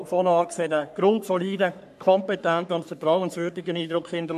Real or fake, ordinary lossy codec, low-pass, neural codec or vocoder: fake; AAC, 96 kbps; 14.4 kHz; codec, 44.1 kHz, 3.4 kbps, Pupu-Codec